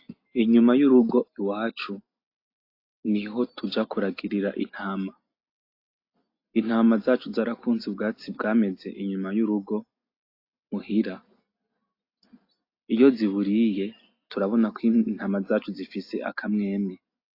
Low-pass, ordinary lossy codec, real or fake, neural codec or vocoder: 5.4 kHz; AAC, 32 kbps; real; none